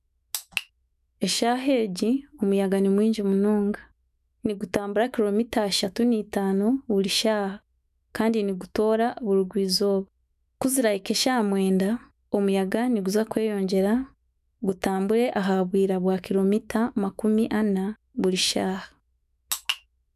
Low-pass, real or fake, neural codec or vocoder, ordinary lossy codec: 14.4 kHz; fake; autoencoder, 48 kHz, 128 numbers a frame, DAC-VAE, trained on Japanese speech; none